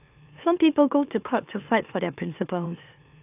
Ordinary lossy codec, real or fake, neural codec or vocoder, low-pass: AAC, 32 kbps; fake; autoencoder, 44.1 kHz, a latent of 192 numbers a frame, MeloTTS; 3.6 kHz